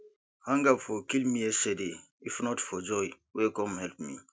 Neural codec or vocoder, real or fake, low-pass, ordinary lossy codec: none; real; none; none